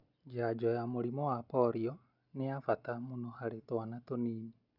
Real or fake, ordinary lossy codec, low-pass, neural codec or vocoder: real; none; 5.4 kHz; none